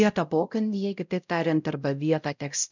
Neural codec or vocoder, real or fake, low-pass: codec, 16 kHz, 0.5 kbps, X-Codec, WavLM features, trained on Multilingual LibriSpeech; fake; 7.2 kHz